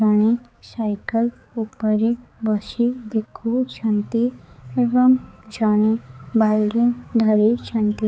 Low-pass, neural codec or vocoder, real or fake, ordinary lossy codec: none; codec, 16 kHz, 4 kbps, X-Codec, HuBERT features, trained on balanced general audio; fake; none